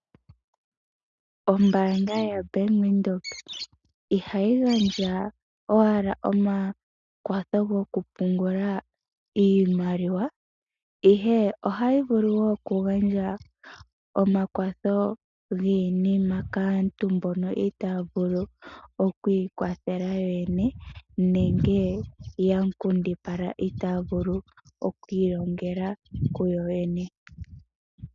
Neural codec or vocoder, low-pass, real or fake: none; 7.2 kHz; real